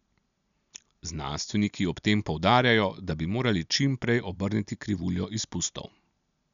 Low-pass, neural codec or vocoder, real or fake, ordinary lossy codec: 7.2 kHz; none; real; none